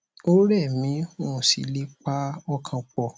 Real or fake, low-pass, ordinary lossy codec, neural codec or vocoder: real; none; none; none